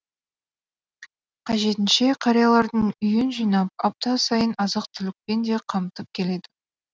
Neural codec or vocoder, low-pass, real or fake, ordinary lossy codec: none; none; real; none